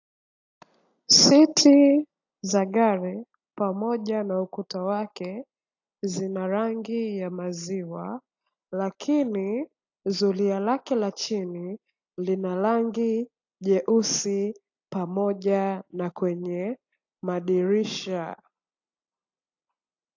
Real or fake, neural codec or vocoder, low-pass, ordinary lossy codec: real; none; 7.2 kHz; AAC, 48 kbps